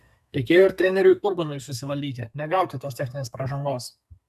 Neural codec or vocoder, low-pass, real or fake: codec, 32 kHz, 1.9 kbps, SNAC; 14.4 kHz; fake